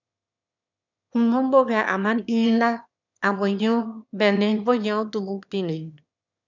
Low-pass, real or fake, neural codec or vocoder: 7.2 kHz; fake; autoencoder, 22.05 kHz, a latent of 192 numbers a frame, VITS, trained on one speaker